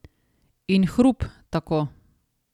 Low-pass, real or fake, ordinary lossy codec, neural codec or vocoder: 19.8 kHz; real; none; none